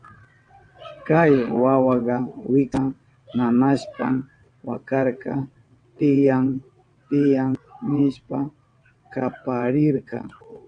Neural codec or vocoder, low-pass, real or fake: vocoder, 22.05 kHz, 80 mel bands, WaveNeXt; 9.9 kHz; fake